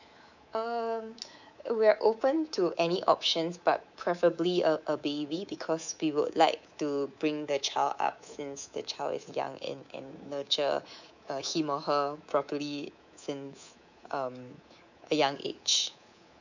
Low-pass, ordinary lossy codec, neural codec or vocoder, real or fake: 7.2 kHz; none; codec, 24 kHz, 3.1 kbps, DualCodec; fake